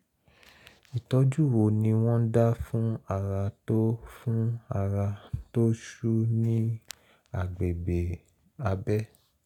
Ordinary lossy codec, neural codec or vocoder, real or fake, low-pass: none; none; real; 19.8 kHz